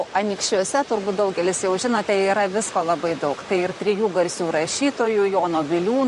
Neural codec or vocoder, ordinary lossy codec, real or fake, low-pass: vocoder, 44.1 kHz, 128 mel bands, Pupu-Vocoder; MP3, 48 kbps; fake; 14.4 kHz